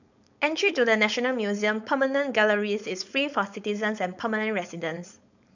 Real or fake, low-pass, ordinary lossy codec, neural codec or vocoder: fake; 7.2 kHz; none; codec, 16 kHz, 4.8 kbps, FACodec